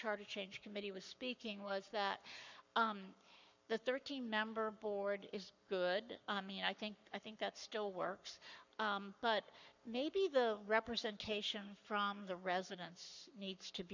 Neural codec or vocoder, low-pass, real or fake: codec, 44.1 kHz, 7.8 kbps, Pupu-Codec; 7.2 kHz; fake